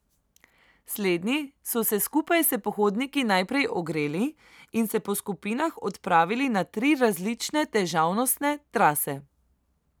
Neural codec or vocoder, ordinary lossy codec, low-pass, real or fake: none; none; none; real